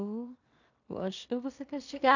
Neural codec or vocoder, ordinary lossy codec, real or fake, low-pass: codec, 16 kHz in and 24 kHz out, 0.4 kbps, LongCat-Audio-Codec, two codebook decoder; none; fake; 7.2 kHz